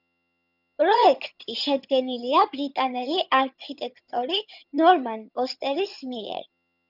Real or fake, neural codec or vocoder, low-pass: fake; vocoder, 22.05 kHz, 80 mel bands, HiFi-GAN; 5.4 kHz